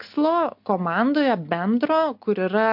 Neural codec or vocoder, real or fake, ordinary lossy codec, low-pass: none; real; MP3, 48 kbps; 5.4 kHz